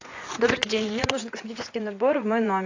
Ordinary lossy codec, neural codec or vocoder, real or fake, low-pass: AAC, 48 kbps; vocoder, 24 kHz, 100 mel bands, Vocos; fake; 7.2 kHz